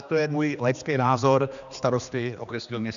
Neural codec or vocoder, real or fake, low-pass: codec, 16 kHz, 2 kbps, X-Codec, HuBERT features, trained on general audio; fake; 7.2 kHz